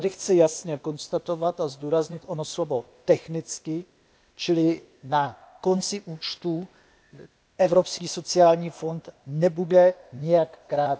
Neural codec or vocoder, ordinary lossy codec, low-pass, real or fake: codec, 16 kHz, 0.8 kbps, ZipCodec; none; none; fake